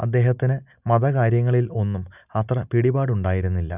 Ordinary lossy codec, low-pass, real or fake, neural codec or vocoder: none; 3.6 kHz; real; none